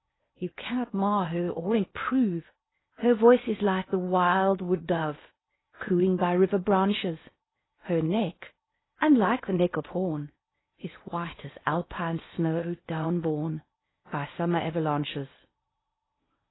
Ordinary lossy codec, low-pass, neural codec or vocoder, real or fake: AAC, 16 kbps; 7.2 kHz; codec, 16 kHz in and 24 kHz out, 0.8 kbps, FocalCodec, streaming, 65536 codes; fake